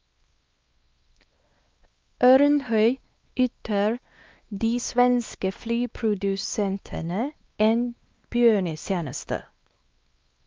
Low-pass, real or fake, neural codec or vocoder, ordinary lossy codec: 7.2 kHz; fake; codec, 16 kHz, 2 kbps, X-Codec, WavLM features, trained on Multilingual LibriSpeech; Opus, 24 kbps